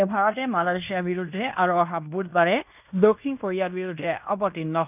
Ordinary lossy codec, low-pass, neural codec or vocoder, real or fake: none; 3.6 kHz; codec, 16 kHz in and 24 kHz out, 0.9 kbps, LongCat-Audio-Codec, fine tuned four codebook decoder; fake